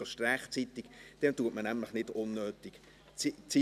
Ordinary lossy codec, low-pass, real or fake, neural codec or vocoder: none; 14.4 kHz; real; none